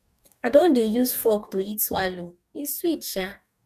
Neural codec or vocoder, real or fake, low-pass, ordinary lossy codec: codec, 44.1 kHz, 2.6 kbps, DAC; fake; 14.4 kHz; none